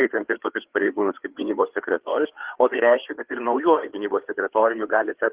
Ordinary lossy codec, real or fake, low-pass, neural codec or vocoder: Opus, 16 kbps; fake; 3.6 kHz; codec, 16 kHz, 4 kbps, FreqCodec, larger model